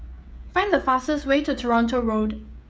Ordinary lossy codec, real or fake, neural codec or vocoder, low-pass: none; fake; codec, 16 kHz, 16 kbps, FreqCodec, smaller model; none